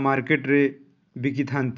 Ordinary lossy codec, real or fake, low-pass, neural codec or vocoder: none; real; 7.2 kHz; none